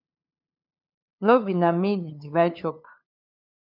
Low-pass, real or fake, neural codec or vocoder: 5.4 kHz; fake; codec, 16 kHz, 2 kbps, FunCodec, trained on LibriTTS, 25 frames a second